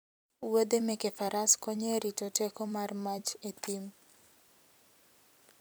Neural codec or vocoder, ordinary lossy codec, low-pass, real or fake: vocoder, 44.1 kHz, 128 mel bands every 256 samples, BigVGAN v2; none; none; fake